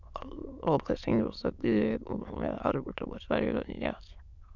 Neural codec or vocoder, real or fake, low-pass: autoencoder, 22.05 kHz, a latent of 192 numbers a frame, VITS, trained on many speakers; fake; 7.2 kHz